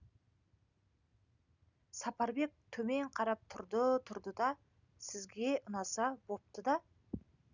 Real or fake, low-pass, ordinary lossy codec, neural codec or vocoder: real; 7.2 kHz; none; none